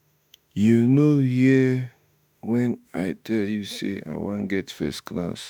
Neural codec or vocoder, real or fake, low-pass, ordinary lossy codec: autoencoder, 48 kHz, 32 numbers a frame, DAC-VAE, trained on Japanese speech; fake; none; none